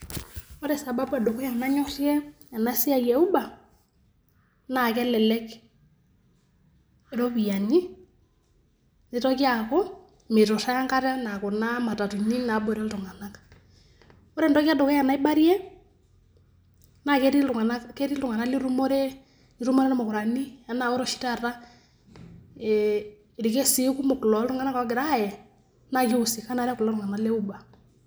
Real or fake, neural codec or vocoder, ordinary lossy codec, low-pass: real; none; none; none